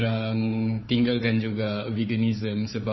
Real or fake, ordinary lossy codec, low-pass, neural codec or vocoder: fake; MP3, 24 kbps; 7.2 kHz; codec, 16 kHz, 4 kbps, FunCodec, trained on Chinese and English, 50 frames a second